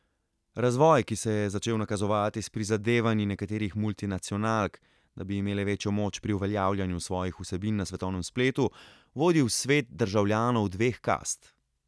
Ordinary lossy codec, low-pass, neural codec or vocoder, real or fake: none; none; none; real